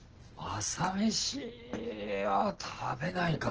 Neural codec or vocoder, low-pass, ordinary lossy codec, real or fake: none; 7.2 kHz; Opus, 16 kbps; real